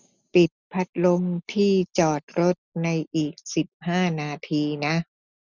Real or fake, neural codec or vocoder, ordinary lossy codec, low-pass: real; none; none; 7.2 kHz